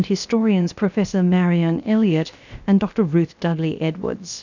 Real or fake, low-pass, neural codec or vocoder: fake; 7.2 kHz; codec, 16 kHz, about 1 kbps, DyCAST, with the encoder's durations